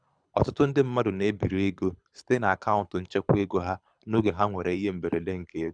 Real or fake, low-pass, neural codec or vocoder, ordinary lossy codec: fake; 9.9 kHz; codec, 24 kHz, 6 kbps, HILCodec; none